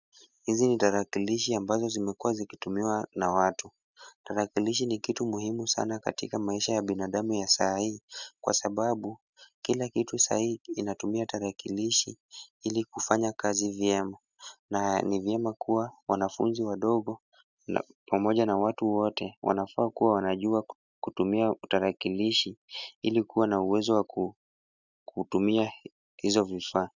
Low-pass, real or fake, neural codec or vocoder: 7.2 kHz; real; none